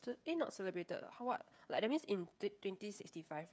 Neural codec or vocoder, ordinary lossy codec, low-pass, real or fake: codec, 16 kHz, 16 kbps, FreqCodec, smaller model; none; none; fake